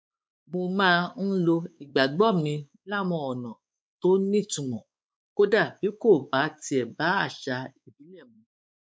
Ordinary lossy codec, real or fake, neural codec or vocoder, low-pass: none; fake; codec, 16 kHz, 4 kbps, X-Codec, WavLM features, trained on Multilingual LibriSpeech; none